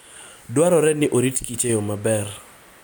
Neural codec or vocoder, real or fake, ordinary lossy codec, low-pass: none; real; none; none